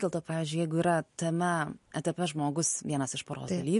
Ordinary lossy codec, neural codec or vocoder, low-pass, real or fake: MP3, 48 kbps; none; 10.8 kHz; real